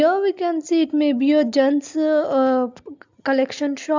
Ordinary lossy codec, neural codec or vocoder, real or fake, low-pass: MP3, 64 kbps; none; real; 7.2 kHz